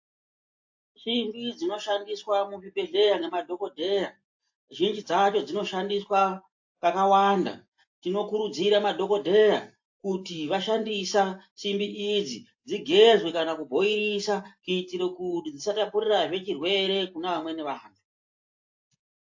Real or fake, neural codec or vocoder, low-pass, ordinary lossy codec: real; none; 7.2 kHz; AAC, 48 kbps